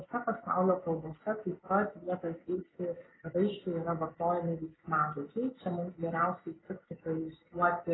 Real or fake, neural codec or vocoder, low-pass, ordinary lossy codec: real; none; 7.2 kHz; AAC, 16 kbps